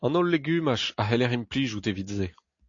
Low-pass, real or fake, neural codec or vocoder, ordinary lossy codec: 7.2 kHz; real; none; AAC, 48 kbps